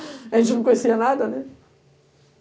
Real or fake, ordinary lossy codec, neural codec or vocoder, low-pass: real; none; none; none